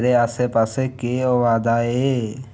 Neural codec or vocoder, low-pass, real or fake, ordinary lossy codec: none; none; real; none